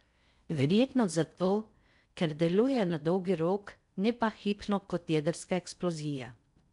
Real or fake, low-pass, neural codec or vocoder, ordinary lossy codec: fake; 10.8 kHz; codec, 16 kHz in and 24 kHz out, 0.6 kbps, FocalCodec, streaming, 4096 codes; none